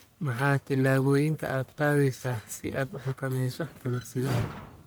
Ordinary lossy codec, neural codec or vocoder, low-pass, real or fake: none; codec, 44.1 kHz, 1.7 kbps, Pupu-Codec; none; fake